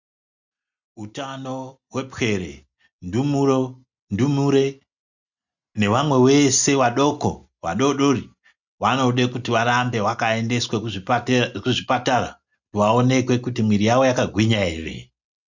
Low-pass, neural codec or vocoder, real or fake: 7.2 kHz; none; real